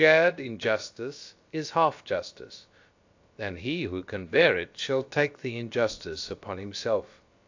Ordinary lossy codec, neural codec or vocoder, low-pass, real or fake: AAC, 48 kbps; codec, 16 kHz, about 1 kbps, DyCAST, with the encoder's durations; 7.2 kHz; fake